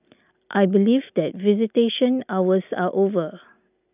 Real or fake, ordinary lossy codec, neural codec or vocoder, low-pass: real; none; none; 3.6 kHz